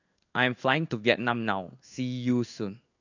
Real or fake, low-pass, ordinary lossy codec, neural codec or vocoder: fake; 7.2 kHz; none; codec, 16 kHz in and 24 kHz out, 1 kbps, XY-Tokenizer